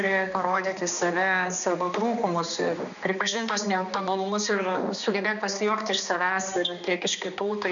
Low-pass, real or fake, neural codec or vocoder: 7.2 kHz; fake; codec, 16 kHz, 2 kbps, X-Codec, HuBERT features, trained on balanced general audio